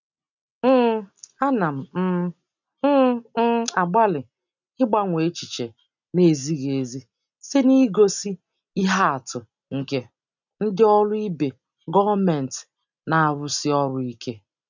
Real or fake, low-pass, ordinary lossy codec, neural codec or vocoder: real; 7.2 kHz; none; none